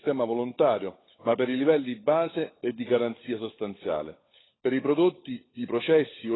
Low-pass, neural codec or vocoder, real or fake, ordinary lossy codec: 7.2 kHz; codec, 16 kHz, 16 kbps, FunCodec, trained on LibriTTS, 50 frames a second; fake; AAC, 16 kbps